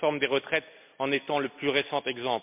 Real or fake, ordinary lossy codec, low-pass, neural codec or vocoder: real; MP3, 32 kbps; 3.6 kHz; none